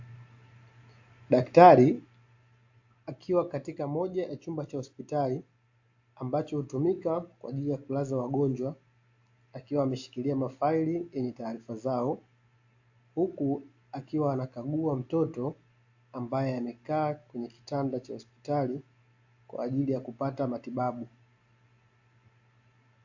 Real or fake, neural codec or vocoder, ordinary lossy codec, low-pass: real; none; AAC, 48 kbps; 7.2 kHz